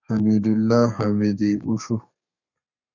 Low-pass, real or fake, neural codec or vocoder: 7.2 kHz; fake; codec, 32 kHz, 1.9 kbps, SNAC